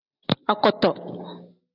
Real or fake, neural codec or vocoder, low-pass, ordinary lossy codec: real; none; 5.4 kHz; AAC, 32 kbps